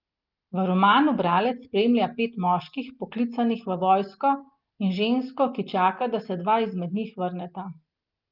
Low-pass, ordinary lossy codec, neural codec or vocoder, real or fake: 5.4 kHz; Opus, 32 kbps; none; real